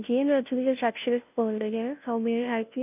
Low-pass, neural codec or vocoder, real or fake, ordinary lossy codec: 3.6 kHz; codec, 16 kHz, 0.5 kbps, FunCodec, trained on Chinese and English, 25 frames a second; fake; none